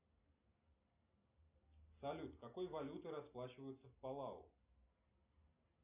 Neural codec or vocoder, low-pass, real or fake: none; 3.6 kHz; real